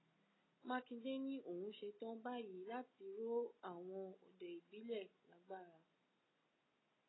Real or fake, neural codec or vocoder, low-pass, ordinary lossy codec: real; none; 7.2 kHz; AAC, 16 kbps